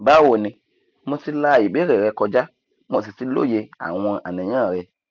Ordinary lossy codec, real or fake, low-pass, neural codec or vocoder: none; real; 7.2 kHz; none